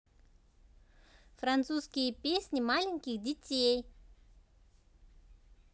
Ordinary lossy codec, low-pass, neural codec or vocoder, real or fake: none; none; none; real